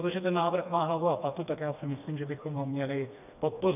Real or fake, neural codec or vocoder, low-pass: fake; codec, 16 kHz, 2 kbps, FreqCodec, smaller model; 3.6 kHz